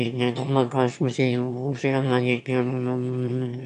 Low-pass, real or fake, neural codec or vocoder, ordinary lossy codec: 9.9 kHz; fake; autoencoder, 22.05 kHz, a latent of 192 numbers a frame, VITS, trained on one speaker; MP3, 96 kbps